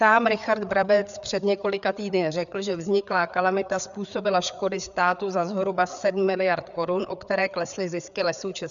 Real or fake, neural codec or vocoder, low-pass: fake; codec, 16 kHz, 4 kbps, FreqCodec, larger model; 7.2 kHz